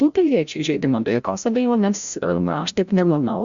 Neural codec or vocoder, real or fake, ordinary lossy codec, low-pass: codec, 16 kHz, 0.5 kbps, FreqCodec, larger model; fake; Opus, 64 kbps; 7.2 kHz